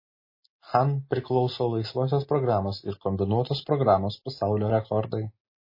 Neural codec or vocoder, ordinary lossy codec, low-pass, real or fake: none; MP3, 24 kbps; 5.4 kHz; real